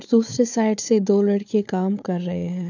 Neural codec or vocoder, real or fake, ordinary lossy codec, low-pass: codec, 16 kHz, 4 kbps, FunCodec, trained on Chinese and English, 50 frames a second; fake; none; 7.2 kHz